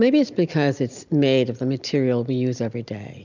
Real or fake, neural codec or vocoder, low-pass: real; none; 7.2 kHz